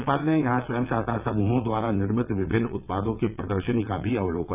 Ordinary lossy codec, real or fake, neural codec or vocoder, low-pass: none; fake; vocoder, 22.05 kHz, 80 mel bands, WaveNeXt; 3.6 kHz